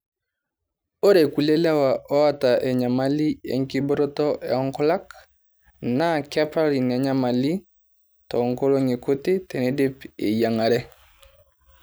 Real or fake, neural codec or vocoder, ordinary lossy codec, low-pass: real; none; none; none